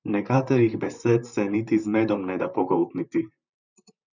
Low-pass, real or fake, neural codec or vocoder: 7.2 kHz; fake; vocoder, 44.1 kHz, 128 mel bands, Pupu-Vocoder